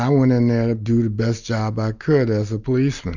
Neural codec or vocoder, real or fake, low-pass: none; real; 7.2 kHz